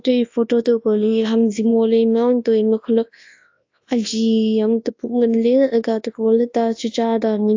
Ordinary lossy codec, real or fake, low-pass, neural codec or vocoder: AAC, 48 kbps; fake; 7.2 kHz; codec, 24 kHz, 0.9 kbps, WavTokenizer, large speech release